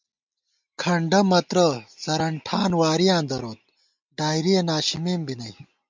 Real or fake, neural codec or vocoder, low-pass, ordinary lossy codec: real; none; 7.2 kHz; MP3, 64 kbps